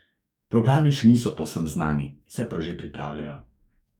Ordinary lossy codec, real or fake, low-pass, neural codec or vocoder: none; fake; 19.8 kHz; codec, 44.1 kHz, 2.6 kbps, DAC